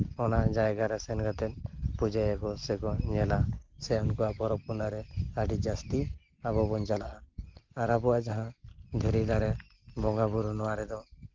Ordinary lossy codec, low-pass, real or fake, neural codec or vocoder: Opus, 16 kbps; 7.2 kHz; real; none